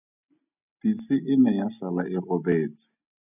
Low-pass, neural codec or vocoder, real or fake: 3.6 kHz; none; real